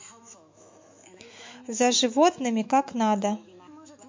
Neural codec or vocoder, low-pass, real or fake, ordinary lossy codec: autoencoder, 48 kHz, 128 numbers a frame, DAC-VAE, trained on Japanese speech; 7.2 kHz; fake; MP3, 48 kbps